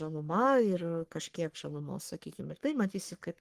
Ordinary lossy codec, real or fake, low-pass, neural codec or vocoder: Opus, 24 kbps; fake; 14.4 kHz; codec, 44.1 kHz, 3.4 kbps, Pupu-Codec